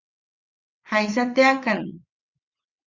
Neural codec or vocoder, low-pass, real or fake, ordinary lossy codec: vocoder, 22.05 kHz, 80 mel bands, WaveNeXt; 7.2 kHz; fake; Opus, 64 kbps